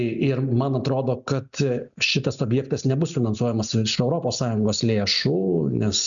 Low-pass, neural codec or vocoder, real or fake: 7.2 kHz; none; real